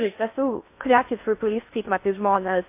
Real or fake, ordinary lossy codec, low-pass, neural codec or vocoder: fake; MP3, 24 kbps; 3.6 kHz; codec, 16 kHz in and 24 kHz out, 0.6 kbps, FocalCodec, streaming, 4096 codes